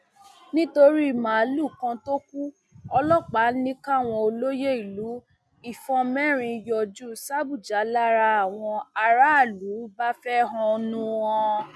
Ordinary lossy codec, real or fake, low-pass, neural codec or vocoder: none; real; none; none